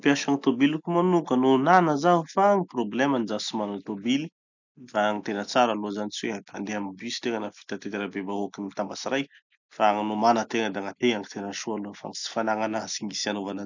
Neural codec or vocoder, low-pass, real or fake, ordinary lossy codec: none; 7.2 kHz; real; none